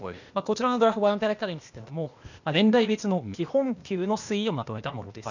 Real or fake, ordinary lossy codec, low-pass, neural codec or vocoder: fake; none; 7.2 kHz; codec, 16 kHz, 0.8 kbps, ZipCodec